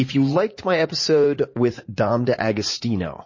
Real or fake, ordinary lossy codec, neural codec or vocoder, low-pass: fake; MP3, 32 kbps; vocoder, 44.1 kHz, 80 mel bands, Vocos; 7.2 kHz